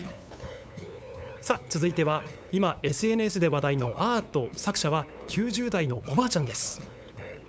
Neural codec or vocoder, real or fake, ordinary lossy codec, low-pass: codec, 16 kHz, 8 kbps, FunCodec, trained on LibriTTS, 25 frames a second; fake; none; none